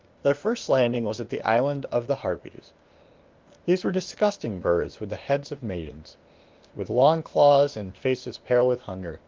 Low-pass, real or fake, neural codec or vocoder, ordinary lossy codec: 7.2 kHz; fake; codec, 16 kHz, 0.8 kbps, ZipCodec; Opus, 32 kbps